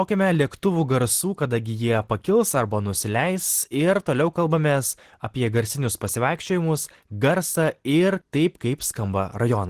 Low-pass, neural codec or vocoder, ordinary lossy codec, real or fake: 14.4 kHz; none; Opus, 16 kbps; real